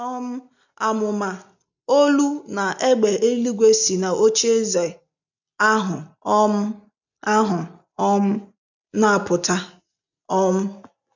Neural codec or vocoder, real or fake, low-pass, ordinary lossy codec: none; real; 7.2 kHz; none